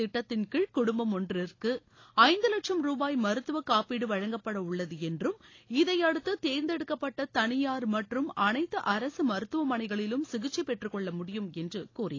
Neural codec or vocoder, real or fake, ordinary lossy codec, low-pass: none; real; AAC, 32 kbps; 7.2 kHz